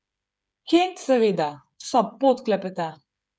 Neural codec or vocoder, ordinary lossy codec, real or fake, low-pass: codec, 16 kHz, 8 kbps, FreqCodec, smaller model; none; fake; none